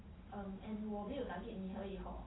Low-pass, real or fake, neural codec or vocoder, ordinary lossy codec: 7.2 kHz; real; none; AAC, 16 kbps